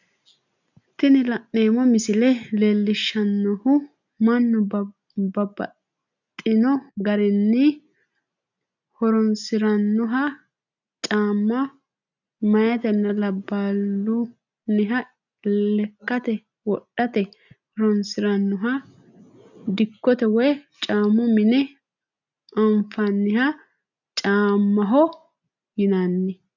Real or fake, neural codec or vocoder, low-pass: real; none; 7.2 kHz